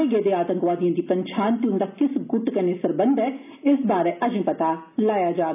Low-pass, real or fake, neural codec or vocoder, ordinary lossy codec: 3.6 kHz; real; none; none